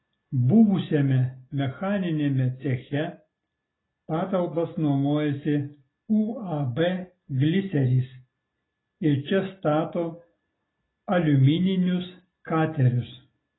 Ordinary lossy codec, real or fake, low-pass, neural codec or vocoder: AAC, 16 kbps; real; 7.2 kHz; none